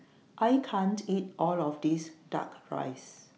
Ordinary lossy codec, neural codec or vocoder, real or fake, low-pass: none; none; real; none